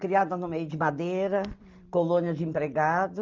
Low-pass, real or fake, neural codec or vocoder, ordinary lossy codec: 7.2 kHz; real; none; Opus, 24 kbps